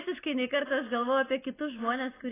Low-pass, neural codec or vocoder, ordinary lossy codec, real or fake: 3.6 kHz; none; AAC, 16 kbps; real